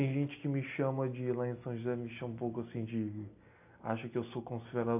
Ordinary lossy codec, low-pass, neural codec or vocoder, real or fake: AAC, 32 kbps; 3.6 kHz; none; real